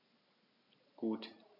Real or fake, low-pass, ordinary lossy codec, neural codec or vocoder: real; 5.4 kHz; none; none